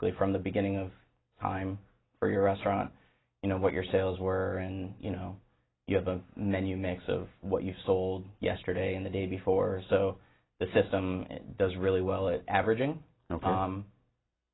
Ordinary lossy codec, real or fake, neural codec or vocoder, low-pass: AAC, 16 kbps; real; none; 7.2 kHz